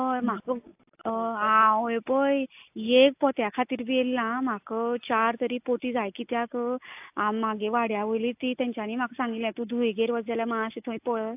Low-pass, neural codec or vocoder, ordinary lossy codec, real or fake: 3.6 kHz; none; AAC, 32 kbps; real